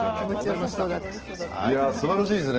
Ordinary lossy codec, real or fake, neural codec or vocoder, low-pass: Opus, 16 kbps; real; none; 7.2 kHz